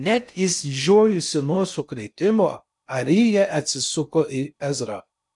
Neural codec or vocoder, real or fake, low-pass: codec, 16 kHz in and 24 kHz out, 0.6 kbps, FocalCodec, streaming, 4096 codes; fake; 10.8 kHz